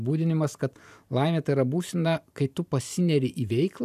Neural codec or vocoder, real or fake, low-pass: vocoder, 44.1 kHz, 128 mel bands every 512 samples, BigVGAN v2; fake; 14.4 kHz